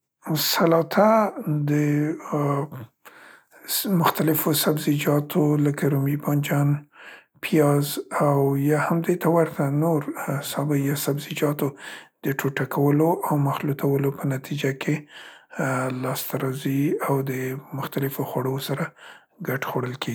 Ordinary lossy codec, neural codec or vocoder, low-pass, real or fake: none; none; none; real